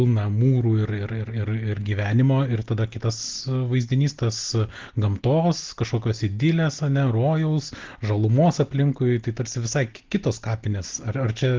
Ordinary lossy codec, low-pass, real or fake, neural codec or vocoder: Opus, 16 kbps; 7.2 kHz; real; none